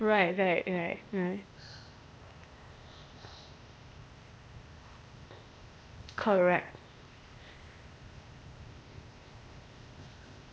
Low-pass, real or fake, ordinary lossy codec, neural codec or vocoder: none; fake; none; codec, 16 kHz, 0.8 kbps, ZipCodec